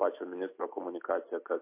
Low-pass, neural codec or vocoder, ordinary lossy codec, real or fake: 3.6 kHz; none; MP3, 24 kbps; real